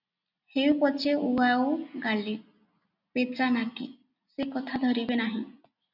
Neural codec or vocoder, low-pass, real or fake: none; 5.4 kHz; real